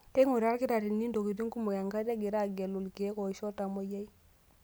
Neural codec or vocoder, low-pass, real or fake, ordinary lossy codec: none; none; real; none